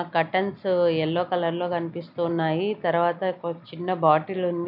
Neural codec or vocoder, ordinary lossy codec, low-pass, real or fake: none; none; 5.4 kHz; real